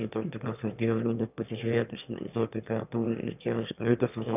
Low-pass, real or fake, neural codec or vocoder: 3.6 kHz; fake; autoencoder, 22.05 kHz, a latent of 192 numbers a frame, VITS, trained on one speaker